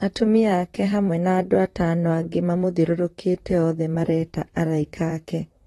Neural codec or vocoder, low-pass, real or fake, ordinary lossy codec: vocoder, 44.1 kHz, 128 mel bands, Pupu-Vocoder; 19.8 kHz; fake; AAC, 32 kbps